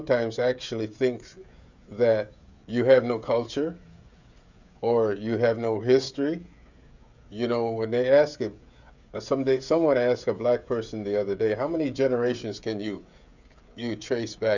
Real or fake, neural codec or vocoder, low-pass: fake; codec, 16 kHz, 16 kbps, FreqCodec, smaller model; 7.2 kHz